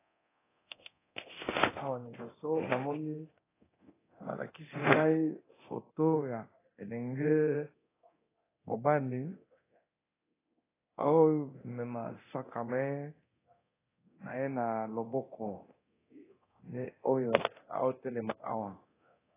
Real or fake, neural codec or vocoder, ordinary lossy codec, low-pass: fake; codec, 24 kHz, 0.9 kbps, DualCodec; AAC, 16 kbps; 3.6 kHz